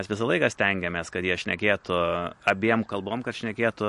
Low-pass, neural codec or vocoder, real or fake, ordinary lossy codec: 14.4 kHz; none; real; MP3, 48 kbps